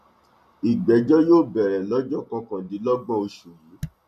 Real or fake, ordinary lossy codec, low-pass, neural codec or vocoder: real; none; 14.4 kHz; none